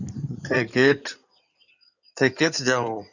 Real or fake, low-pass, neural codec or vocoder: fake; 7.2 kHz; codec, 16 kHz in and 24 kHz out, 2.2 kbps, FireRedTTS-2 codec